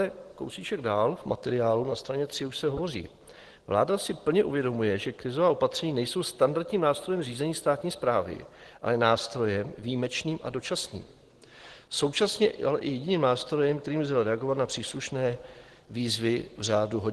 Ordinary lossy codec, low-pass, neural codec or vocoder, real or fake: Opus, 16 kbps; 10.8 kHz; none; real